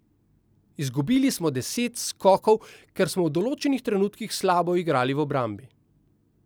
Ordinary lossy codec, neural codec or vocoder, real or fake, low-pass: none; none; real; none